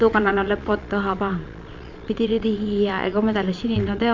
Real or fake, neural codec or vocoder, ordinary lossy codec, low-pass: fake; vocoder, 22.05 kHz, 80 mel bands, Vocos; MP3, 64 kbps; 7.2 kHz